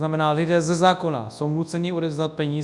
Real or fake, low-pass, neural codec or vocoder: fake; 10.8 kHz; codec, 24 kHz, 0.9 kbps, WavTokenizer, large speech release